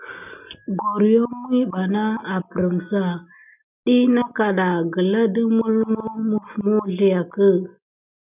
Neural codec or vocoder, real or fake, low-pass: none; real; 3.6 kHz